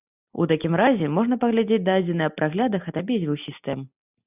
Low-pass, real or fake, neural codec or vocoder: 3.6 kHz; real; none